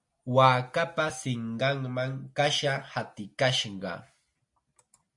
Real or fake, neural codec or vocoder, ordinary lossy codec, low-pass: real; none; MP3, 48 kbps; 10.8 kHz